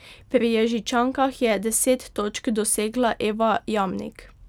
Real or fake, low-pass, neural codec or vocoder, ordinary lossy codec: real; 19.8 kHz; none; none